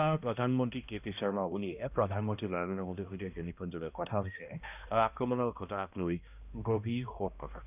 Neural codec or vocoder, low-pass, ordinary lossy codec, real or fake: codec, 16 kHz, 1 kbps, X-Codec, HuBERT features, trained on balanced general audio; 3.6 kHz; none; fake